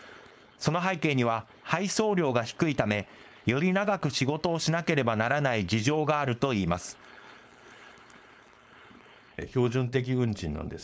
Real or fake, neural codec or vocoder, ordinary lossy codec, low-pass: fake; codec, 16 kHz, 4.8 kbps, FACodec; none; none